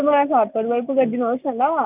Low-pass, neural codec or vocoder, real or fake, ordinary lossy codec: 3.6 kHz; none; real; none